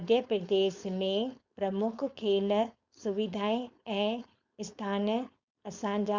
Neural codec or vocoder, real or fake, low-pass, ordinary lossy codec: codec, 16 kHz, 4.8 kbps, FACodec; fake; 7.2 kHz; Opus, 64 kbps